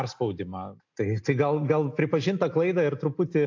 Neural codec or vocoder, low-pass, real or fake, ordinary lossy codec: none; 7.2 kHz; real; AAC, 48 kbps